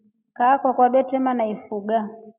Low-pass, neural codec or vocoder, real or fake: 3.6 kHz; none; real